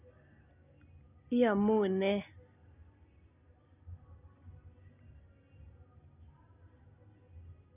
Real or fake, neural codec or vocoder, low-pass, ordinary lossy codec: real; none; 3.6 kHz; none